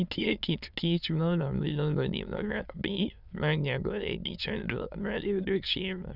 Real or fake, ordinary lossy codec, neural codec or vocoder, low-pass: fake; none; autoencoder, 22.05 kHz, a latent of 192 numbers a frame, VITS, trained on many speakers; 5.4 kHz